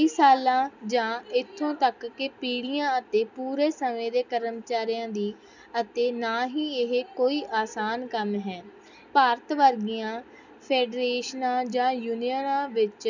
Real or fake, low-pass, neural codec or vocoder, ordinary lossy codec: real; 7.2 kHz; none; none